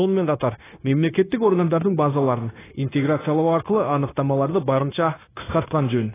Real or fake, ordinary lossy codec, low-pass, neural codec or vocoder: fake; AAC, 16 kbps; 3.6 kHz; codec, 16 kHz, 0.9 kbps, LongCat-Audio-Codec